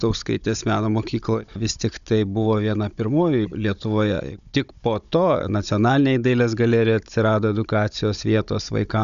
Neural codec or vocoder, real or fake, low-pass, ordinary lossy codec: codec, 16 kHz, 16 kbps, FunCodec, trained on Chinese and English, 50 frames a second; fake; 7.2 kHz; MP3, 96 kbps